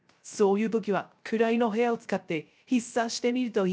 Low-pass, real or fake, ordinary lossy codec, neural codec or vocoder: none; fake; none; codec, 16 kHz, 0.3 kbps, FocalCodec